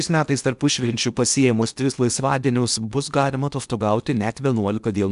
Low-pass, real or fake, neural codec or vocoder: 10.8 kHz; fake; codec, 16 kHz in and 24 kHz out, 0.8 kbps, FocalCodec, streaming, 65536 codes